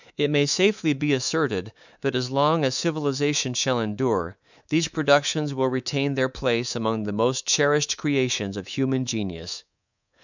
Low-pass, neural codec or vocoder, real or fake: 7.2 kHz; codec, 24 kHz, 3.1 kbps, DualCodec; fake